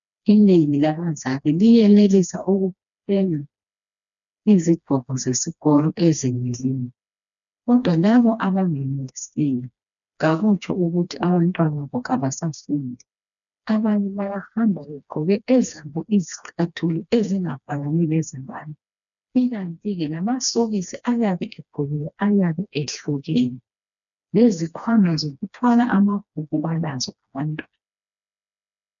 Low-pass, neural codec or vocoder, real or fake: 7.2 kHz; codec, 16 kHz, 2 kbps, FreqCodec, smaller model; fake